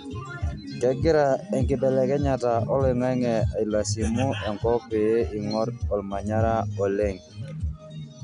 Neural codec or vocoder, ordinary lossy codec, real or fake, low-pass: none; none; real; 10.8 kHz